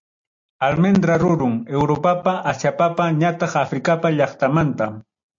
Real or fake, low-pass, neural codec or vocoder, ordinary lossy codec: real; 7.2 kHz; none; AAC, 64 kbps